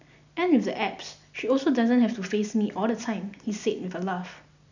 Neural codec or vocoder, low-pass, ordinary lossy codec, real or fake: none; 7.2 kHz; none; real